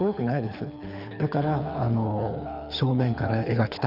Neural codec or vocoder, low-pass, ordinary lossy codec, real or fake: codec, 24 kHz, 6 kbps, HILCodec; 5.4 kHz; none; fake